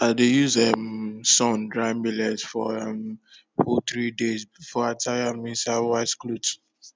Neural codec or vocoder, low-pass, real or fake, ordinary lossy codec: none; none; real; none